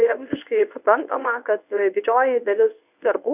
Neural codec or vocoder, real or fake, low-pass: codec, 24 kHz, 0.9 kbps, WavTokenizer, medium speech release version 1; fake; 3.6 kHz